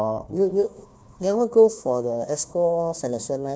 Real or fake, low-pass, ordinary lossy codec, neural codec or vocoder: fake; none; none; codec, 16 kHz, 1 kbps, FunCodec, trained on Chinese and English, 50 frames a second